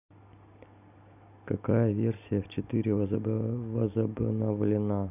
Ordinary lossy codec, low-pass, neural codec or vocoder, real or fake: none; 3.6 kHz; none; real